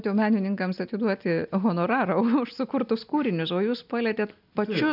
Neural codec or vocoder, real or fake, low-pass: none; real; 5.4 kHz